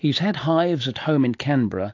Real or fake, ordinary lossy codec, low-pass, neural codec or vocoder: real; AAC, 48 kbps; 7.2 kHz; none